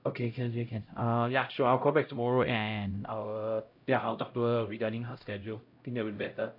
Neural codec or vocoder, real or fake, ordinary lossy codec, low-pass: codec, 16 kHz, 0.5 kbps, X-Codec, HuBERT features, trained on LibriSpeech; fake; AAC, 48 kbps; 5.4 kHz